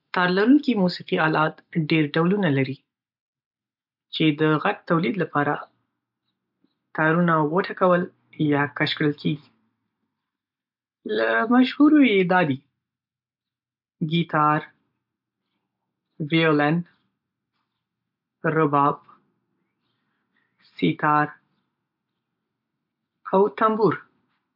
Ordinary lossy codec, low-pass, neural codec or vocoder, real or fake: MP3, 48 kbps; 5.4 kHz; none; real